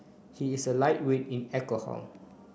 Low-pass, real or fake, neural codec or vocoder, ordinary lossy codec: none; real; none; none